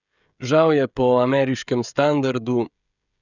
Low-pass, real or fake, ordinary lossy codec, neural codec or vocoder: 7.2 kHz; fake; none; codec, 16 kHz, 16 kbps, FreqCodec, smaller model